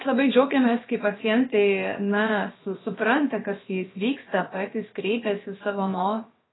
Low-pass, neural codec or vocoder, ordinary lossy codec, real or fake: 7.2 kHz; codec, 16 kHz, about 1 kbps, DyCAST, with the encoder's durations; AAC, 16 kbps; fake